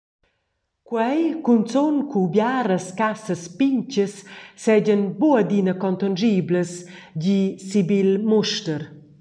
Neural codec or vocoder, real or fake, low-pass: none; real; 9.9 kHz